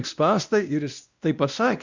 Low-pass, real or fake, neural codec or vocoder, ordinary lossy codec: 7.2 kHz; fake; codec, 16 kHz, 1 kbps, X-Codec, WavLM features, trained on Multilingual LibriSpeech; Opus, 64 kbps